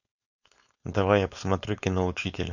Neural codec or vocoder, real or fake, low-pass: codec, 16 kHz, 4.8 kbps, FACodec; fake; 7.2 kHz